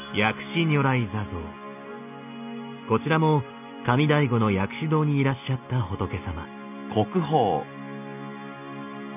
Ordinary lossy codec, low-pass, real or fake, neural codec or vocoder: none; 3.6 kHz; real; none